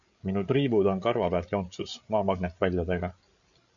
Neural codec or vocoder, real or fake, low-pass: codec, 16 kHz, 8 kbps, FreqCodec, larger model; fake; 7.2 kHz